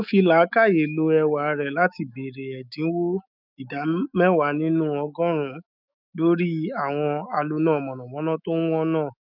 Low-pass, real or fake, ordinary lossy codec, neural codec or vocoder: 5.4 kHz; fake; none; autoencoder, 48 kHz, 128 numbers a frame, DAC-VAE, trained on Japanese speech